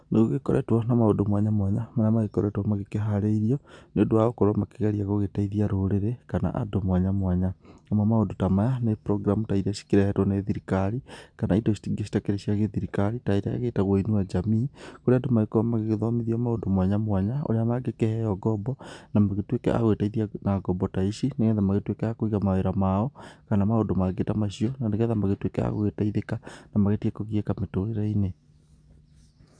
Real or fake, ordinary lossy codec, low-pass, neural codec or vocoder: real; none; 9.9 kHz; none